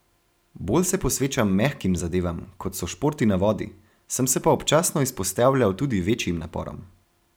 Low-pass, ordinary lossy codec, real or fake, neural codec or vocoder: none; none; real; none